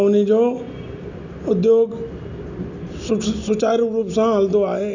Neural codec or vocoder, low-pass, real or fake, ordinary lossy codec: none; 7.2 kHz; real; none